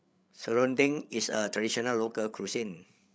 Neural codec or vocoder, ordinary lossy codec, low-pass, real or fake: codec, 16 kHz, 8 kbps, FreqCodec, larger model; none; none; fake